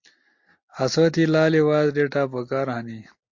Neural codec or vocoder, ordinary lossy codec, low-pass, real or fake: none; MP3, 48 kbps; 7.2 kHz; real